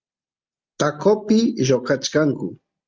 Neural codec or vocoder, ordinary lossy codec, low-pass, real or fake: none; Opus, 24 kbps; 7.2 kHz; real